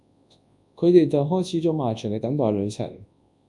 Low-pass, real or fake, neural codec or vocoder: 10.8 kHz; fake; codec, 24 kHz, 0.9 kbps, WavTokenizer, large speech release